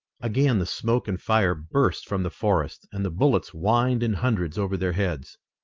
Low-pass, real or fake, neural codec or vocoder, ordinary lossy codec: 7.2 kHz; real; none; Opus, 32 kbps